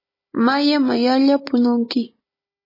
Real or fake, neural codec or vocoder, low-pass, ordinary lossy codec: fake; codec, 16 kHz, 16 kbps, FunCodec, trained on Chinese and English, 50 frames a second; 5.4 kHz; MP3, 24 kbps